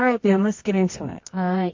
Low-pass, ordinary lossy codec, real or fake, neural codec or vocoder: 7.2 kHz; MP3, 48 kbps; fake; codec, 24 kHz, 0.9 kbps, WavTokenizer, medium music audio release